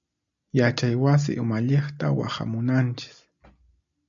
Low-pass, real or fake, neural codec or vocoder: 7.2 kHz; real; none